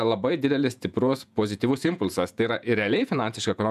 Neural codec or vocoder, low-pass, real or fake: vocoder, 48 kHz, 128 mel bands, Vocos; 14.4 kHz; fake